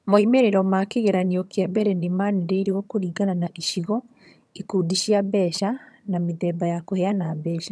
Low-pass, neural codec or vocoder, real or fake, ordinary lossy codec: none; vocoder, 22.05 kHz, 80 mel bands, HiFi-GAN; fake; none